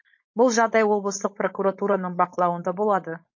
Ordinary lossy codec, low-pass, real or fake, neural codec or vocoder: MP3, 32 kbps; 7.2 kHz; fake; codec, 16 kHz, 4.8 kbps, FACodec